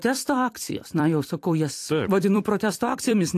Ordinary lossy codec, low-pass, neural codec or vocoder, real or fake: AAC, 64 kbps; 14.4 kHz; vocoder, 44.1 kHz, 128 mel bands every 256 samples, BigVGAN v2; fake